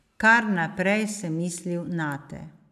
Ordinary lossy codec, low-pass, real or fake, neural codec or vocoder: none; 14.4 kHz; real; none